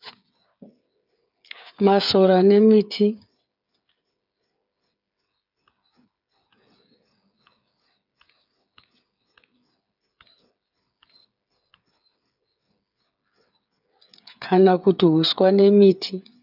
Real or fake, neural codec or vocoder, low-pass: fake; codec, 16 kHz, 8 kbps, FreqCodec, smaller model; 5.4 kHz